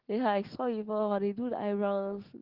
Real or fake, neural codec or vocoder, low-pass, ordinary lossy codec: real; none; 5.4 kHz; Opus, 16 kbps